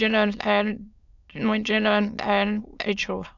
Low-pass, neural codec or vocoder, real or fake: 7.2 kHz; autoencoder, 22.05 kHz, a latent of 192 numbers a frame, VITS, trained on many speakers; fake